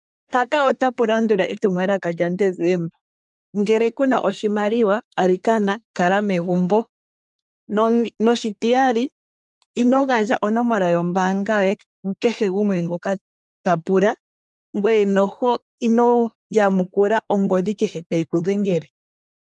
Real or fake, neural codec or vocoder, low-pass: fake; codec, 24 kHz, 1 kbps, SNAC; 10.8 kHz